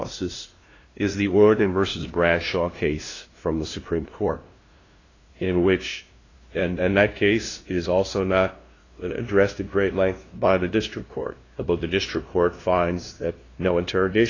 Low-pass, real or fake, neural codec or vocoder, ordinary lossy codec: 7.2 kHz; fake; codec, 16 kHz, 0.5 kbps, FunCodec, trained on LibriTTS, 25 frames a second; AAC, 32 kbps